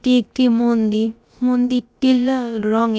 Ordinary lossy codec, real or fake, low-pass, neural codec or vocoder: none; fake; none; codec, 16 kHz, about 1 kbps, DyCAST, with the encoder's durations